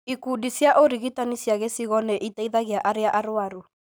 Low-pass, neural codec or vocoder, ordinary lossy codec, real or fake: none; none; none; real